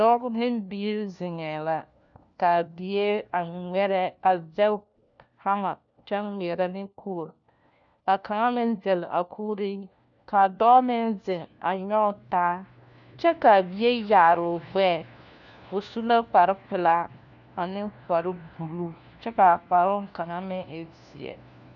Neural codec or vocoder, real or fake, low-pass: codec, 16 kHz, 1 kbps, FunCodec, trained on LibriTTS, 50 frames a second; fake; 7.2 kHz